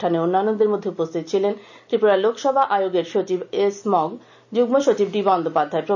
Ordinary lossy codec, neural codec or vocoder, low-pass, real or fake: none; none; 7.2 kHz; real